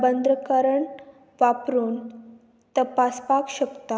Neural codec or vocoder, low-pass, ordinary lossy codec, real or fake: none; none; none; real